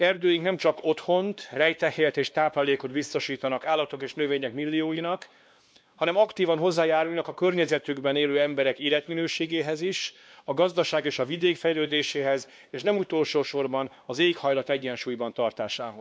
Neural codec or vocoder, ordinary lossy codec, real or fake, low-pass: codec, 16 kHz, 2 kbps, X-Codec, WavLM features, trained on Multilingual LibriSpeech; none; fake; none